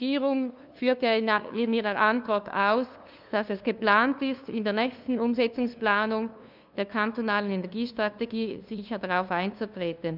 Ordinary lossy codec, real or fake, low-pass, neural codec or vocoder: none; fake; 5.4 kHz; codec, 16 kHz, 2 kbps, FunCodec, trained on LibriTTS, 25 frames a second